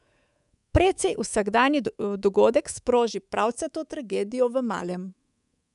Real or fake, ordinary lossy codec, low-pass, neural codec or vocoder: fake; none; 10.8 kHz; codec, 24 kHz, 3.1 kbps, DualCodec